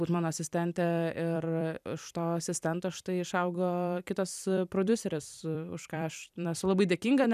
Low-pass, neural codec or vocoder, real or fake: 14.4 kHz; vocoder, 44.1 kHz, 128 mel bands every 256 samples, BigVGAN v2; fake